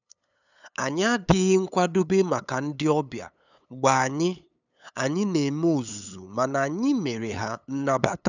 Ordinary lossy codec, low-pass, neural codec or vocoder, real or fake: none; 7.2 kHz; codec, 16 kHz, 8 kbps, FunCodec, trained on LibriTTS, 25 frames a second; fake